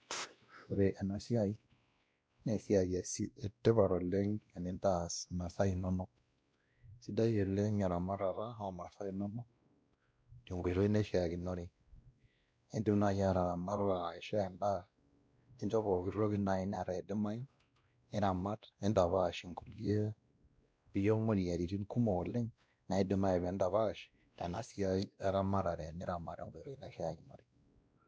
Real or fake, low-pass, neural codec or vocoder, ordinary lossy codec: fake; none; codec, 16 kHz, 1 kbps, X-Codec, WavLM features, trained on Multilingual LibriSpeech; none